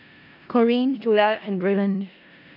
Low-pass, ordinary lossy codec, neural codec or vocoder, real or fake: 5.4 kHz; none; codec, 16 kHz in and 24 kHz out, 0.4 kbps, LongCat-Audio-Codec, four codebook decoder; fake